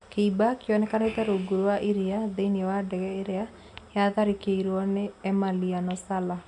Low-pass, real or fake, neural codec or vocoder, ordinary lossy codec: 10.8 kHz; real; none; none